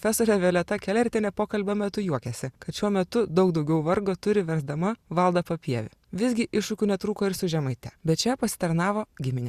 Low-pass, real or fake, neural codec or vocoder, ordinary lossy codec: 14.4 kHz; real; none; Opus, 64 kbps